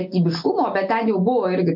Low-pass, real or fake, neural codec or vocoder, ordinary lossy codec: 5.4 kHz; real; none; AAC, 48 kbps